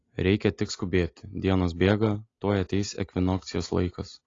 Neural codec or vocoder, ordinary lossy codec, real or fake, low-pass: none; AAC, 32 kbps; real; 7.2 kHz